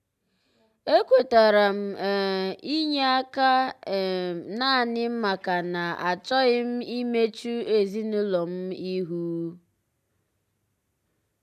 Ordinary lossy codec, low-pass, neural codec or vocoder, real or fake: none; 14.4 kHz; none; real